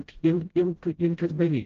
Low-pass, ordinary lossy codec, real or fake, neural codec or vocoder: 7.2 kHz; Opus, 16 kbps; fake; codec, 16 kHz, 0.5 kbps, FreqCodec, smaller model